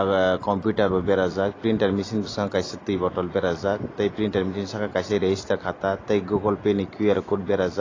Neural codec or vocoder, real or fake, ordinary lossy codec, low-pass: none; real; AAC, 32 kbps; 7.2 kHz